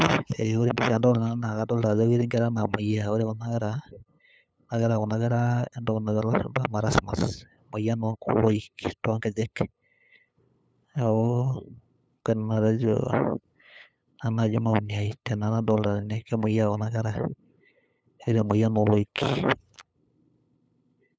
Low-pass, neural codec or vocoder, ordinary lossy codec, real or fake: none; codec, 16 kHz, 8 kbps, FunCodec, trained on LibriTTS, 25 frames a second; none; fake